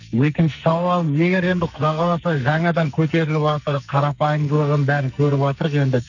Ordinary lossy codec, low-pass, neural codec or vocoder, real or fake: none; 7.2 kHz; codec, 44.1 kHz, 2.6 kbps, SNAC; fake